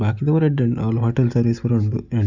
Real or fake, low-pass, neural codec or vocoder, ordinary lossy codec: real; 7.2 kHz; none; none